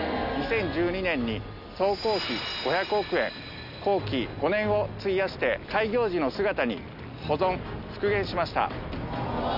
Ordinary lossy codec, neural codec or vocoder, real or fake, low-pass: none; none; real; 5.4 kHz